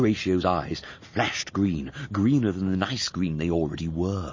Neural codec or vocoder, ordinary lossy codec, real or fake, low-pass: none; MP3, 32 kbps; real; 7.2 kHz